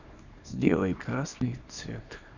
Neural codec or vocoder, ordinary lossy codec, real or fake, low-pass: codec, 24 kHz, 0.9 kbps, WavTokenizer, small release; none; fake; 7.2 kHz